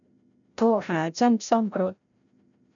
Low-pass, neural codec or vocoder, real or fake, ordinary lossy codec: 7.2 kHz; codec, 16 kHz, 0.5 kbps, FreqCodec, larger model; fake; none